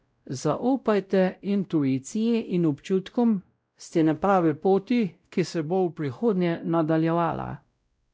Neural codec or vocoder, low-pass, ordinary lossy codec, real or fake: codec, 16 kHz, 0.5 kbps, X-Codec, WavLM features, trained on Multilingual LibriSpeech; none; none; fake